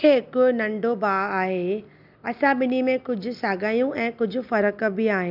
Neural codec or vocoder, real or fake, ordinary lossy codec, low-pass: none; real; none; 5.4 kHz